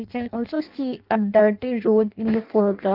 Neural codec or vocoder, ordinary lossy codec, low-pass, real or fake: codec, 16 kHz in and 24 kHz out, 0.6 kbps, FireRedTTS-2 codec; Opus, 32 kbps; 5.4 kHz; fake